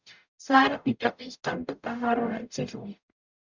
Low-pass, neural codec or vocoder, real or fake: 7.2 kHz; codec, 44.1 kHz, 0.9 kbps, DAC; fake